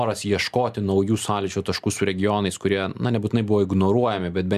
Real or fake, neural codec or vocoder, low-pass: real; none; 14.4 kHz